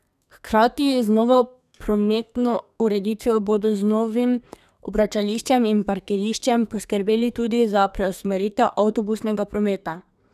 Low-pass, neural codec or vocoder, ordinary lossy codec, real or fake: 14.4 kHz; codec, 32 kHz, 1.9 kbps, SNAC; none; fake